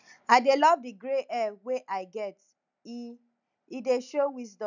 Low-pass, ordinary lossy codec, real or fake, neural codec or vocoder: 7.2 kHz; none; real; none